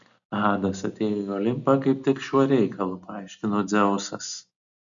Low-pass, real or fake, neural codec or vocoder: 7.2 kHz; real; none